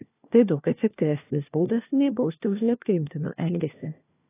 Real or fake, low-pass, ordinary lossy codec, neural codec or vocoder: fake; 3.6 kHz; AAC, 16 kbps; codec, 16 kHz, 1 kbps, FunCodec, trained on LibriTTS, 50 frames a second